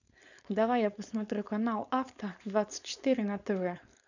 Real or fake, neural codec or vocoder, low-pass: fake; codec, 16 kHz, 4.8 kbps, FACodec; 7.2 kHz